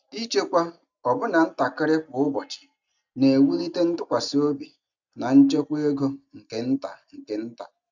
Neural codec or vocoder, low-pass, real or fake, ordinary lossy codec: none; 7.2 kHz; real; none